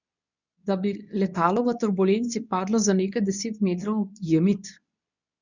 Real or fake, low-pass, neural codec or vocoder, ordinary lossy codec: fake; 7.2 kHz; codec, 24 kHz, 0.9 kbps, WavTokenizer, medium speech release version 2; none